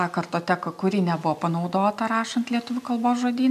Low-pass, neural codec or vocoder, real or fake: 14.4 kHz; none; real